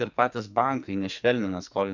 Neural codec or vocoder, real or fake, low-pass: codec, 44.1 kHz, 2.6 kbps, SNAC; fake; 7.2 kHz